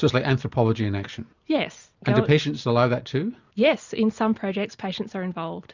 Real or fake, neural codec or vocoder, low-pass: real; none; 7.2 kHz